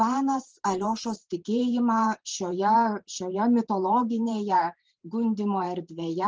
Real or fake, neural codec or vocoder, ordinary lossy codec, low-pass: fake; vocoder, 44.1 kHz, 128 mel bands every 512 samples, BigVGAN v2; Opus, 24 kbps; 7.2 kHz